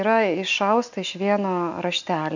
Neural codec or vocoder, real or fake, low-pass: none; real; 7.2 kHz